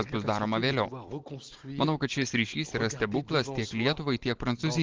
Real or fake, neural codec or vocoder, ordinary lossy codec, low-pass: real; none; Opus, 16 kbps; 7.2 kHz